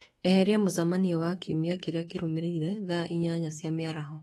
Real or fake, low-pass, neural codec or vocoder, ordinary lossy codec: fake; 19.8 kHz; autoencoder, 48 kHz, 32 numbers a frame, DAC-VAE, trained on Japanese speech; AAC, 32 kbps